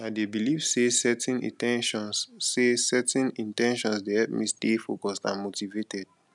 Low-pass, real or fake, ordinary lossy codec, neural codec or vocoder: 10.8 kHz; real; none; none